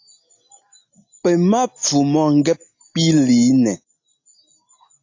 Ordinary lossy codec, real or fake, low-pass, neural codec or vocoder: AAC, 48 kbps; real; 7.2 kHz; none